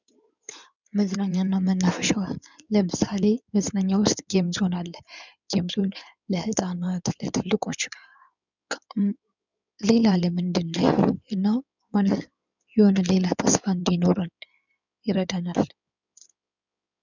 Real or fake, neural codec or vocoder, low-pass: fake; codec, 16 kHz in and 24 kHz out, 2.2 kbps, FireRedTTS-2 codec; 7.2 kHz